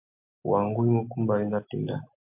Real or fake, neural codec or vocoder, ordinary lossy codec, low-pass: real; none; MP3, 32 kbps; 3.6 kHz